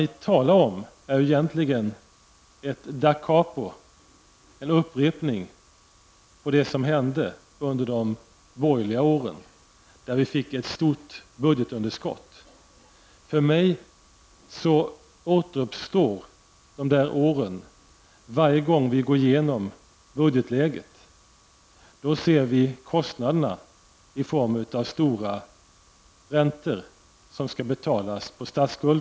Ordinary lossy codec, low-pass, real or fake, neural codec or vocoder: none; none; real; none